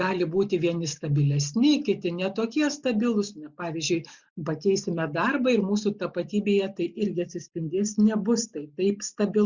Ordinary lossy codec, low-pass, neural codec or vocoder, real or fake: Opus, 64 kbps; 7.2 kHz; none; real